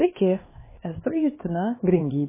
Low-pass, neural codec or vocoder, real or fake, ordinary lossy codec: 3.6 kHz; codec, 16 kHz, 2 kbps, X-Codec, HuBERT features, trained on LibriSpeech; fake; MP3, 16 kbps